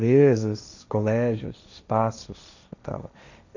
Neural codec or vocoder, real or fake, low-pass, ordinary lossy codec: codec, 16 kHz, 1.1 kbps, Voila-Tokenizer; fake; 7.2 kHz; none